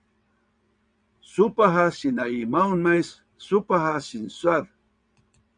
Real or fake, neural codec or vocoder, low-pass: fake; vocoder, 22.05 kHz, 80 mel bands, WaveNeXt; 9.9 kHz